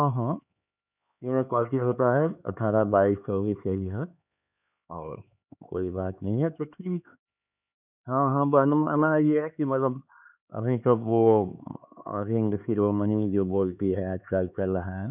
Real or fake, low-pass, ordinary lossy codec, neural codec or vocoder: fake; 3.6 kHz; none; codec, 16 kHz, 4 kbps, X-Codec, HuBERT features, trained on LibriSpeech